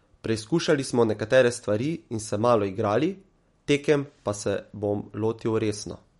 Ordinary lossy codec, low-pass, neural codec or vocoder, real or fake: MP3, 48 kbps; 14.4 kHz; none; real